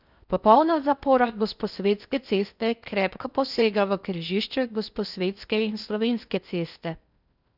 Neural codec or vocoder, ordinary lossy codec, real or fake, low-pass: codec, 16 kHz in and 24 kHz out, 0.6 kbps, FocalCodec, streaming, 4096 codes; none; fake; 5.4 kHz